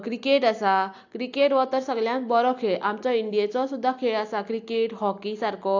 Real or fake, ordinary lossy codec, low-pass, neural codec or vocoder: real; AAC, 48 kbps; 7.2 kHz; none